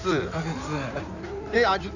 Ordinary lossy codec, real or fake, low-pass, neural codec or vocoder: none; fake; 7.2 kHz; codec, 16 kHz in and 24 kHz out, 2.2 kbps, FireRedTTS-2 codec